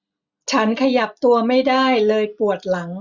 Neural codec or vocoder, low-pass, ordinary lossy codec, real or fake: none; 7.2 kHz; none; real